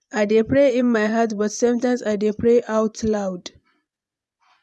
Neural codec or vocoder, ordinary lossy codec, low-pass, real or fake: vocoder, 24 kHz, 100 mel bands, Vocos; none; none; fake